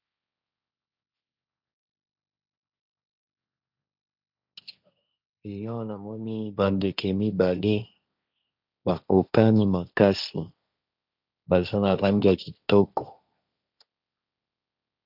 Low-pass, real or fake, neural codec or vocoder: 5.4 kHz; fake; codec, 16 kHz, 1.1 kbps, Voila-Tokenizer